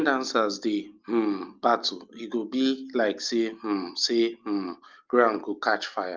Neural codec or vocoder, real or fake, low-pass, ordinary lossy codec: none; real; 7.2 kHz; Opus, 24 kbps